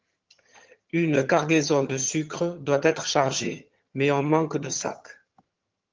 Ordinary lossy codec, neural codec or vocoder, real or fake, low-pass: Opus, 32 kbps; vocoder, 22.05 kHz, 80 mel bands, HiFi-GAN; fake; 7.2 kHz